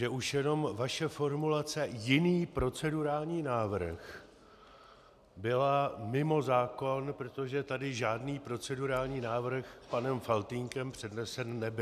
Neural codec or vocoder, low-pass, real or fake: none; 14.4 kHz; real